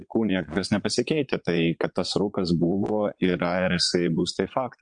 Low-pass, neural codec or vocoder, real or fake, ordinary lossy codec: 9.9 kHz; vocoder, 22.05 kHz, 80 mel bands, Vocos; fake; MP3, 64 kbps